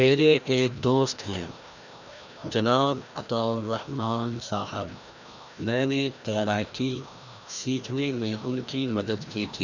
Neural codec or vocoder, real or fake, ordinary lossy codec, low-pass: codec, 16 kHz, 1 kbps, FreqCodec, larger model; fake; none; 7.2 kHz